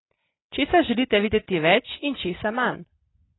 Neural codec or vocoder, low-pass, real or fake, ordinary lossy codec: none; 7.2 kHz; real; AAC, 16 kbps